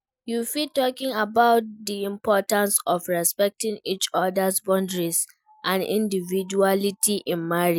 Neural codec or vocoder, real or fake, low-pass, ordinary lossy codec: none; real; none; none